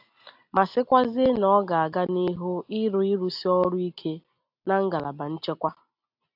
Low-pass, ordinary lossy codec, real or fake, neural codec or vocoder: 5.4 kHz; AAC, 48 kbps; real; none